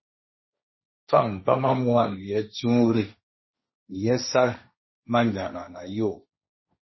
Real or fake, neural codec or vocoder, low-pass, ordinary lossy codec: fake; codec, 16 kHz, 1.1 kbps, Voila-Tokenizer; 7.2 kHz; MP3, 24 kbps